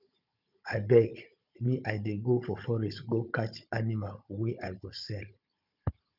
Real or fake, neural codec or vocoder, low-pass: fake; codec, 16 kHz, 16 kbps, FunCodec, trained on Chinese and English, 50 frames a second; 5.4 kHz